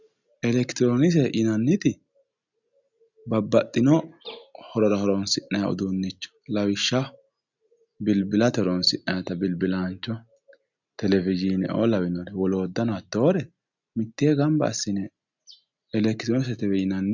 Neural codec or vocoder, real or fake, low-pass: none; real; 7.2 kHz